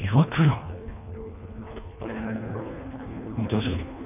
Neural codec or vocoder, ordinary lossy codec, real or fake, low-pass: codec, 24 kHz, 1.5 kbps, HILCodec; none; fake; 3.6 kHz